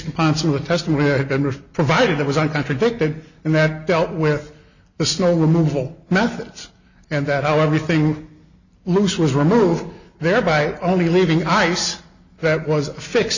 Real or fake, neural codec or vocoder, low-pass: real; none; 7.2 kHz